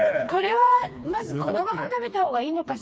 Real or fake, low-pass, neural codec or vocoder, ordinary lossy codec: fake; none; codec, 16 kHz, 2 kbps, FreqCodec, smaller model; none